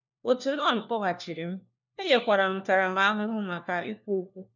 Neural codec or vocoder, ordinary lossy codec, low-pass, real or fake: codec, 16 kHz, 1 kbps, FunCodec, trained on LibriTTS, 50 frames a second; none; 7.2 kHz; fake